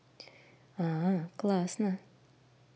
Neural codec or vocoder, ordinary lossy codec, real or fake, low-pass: none; none; real; none